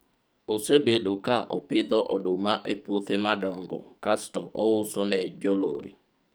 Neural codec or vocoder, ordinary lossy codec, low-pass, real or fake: codec, 44.1 kHz, 2.6 kbps, SNAC; none; none; fake